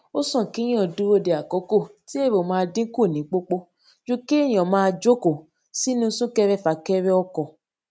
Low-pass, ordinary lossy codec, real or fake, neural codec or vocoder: none; none; real; none